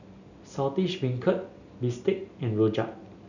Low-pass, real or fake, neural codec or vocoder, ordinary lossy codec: 7.2 kHz; real; none; none